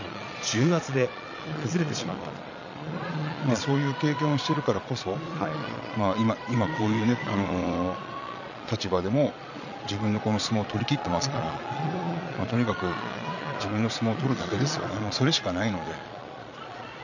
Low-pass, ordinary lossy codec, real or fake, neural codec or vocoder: 7.2 kHz; none; fake; vocoder, 22.05 kHz, 80 mel bands, Vocos